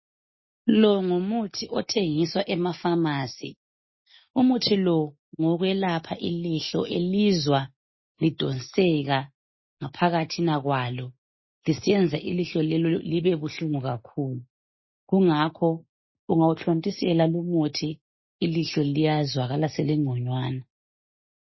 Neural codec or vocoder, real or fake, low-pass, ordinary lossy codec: none; real; 7.2 kHz; MP3, 24 kbps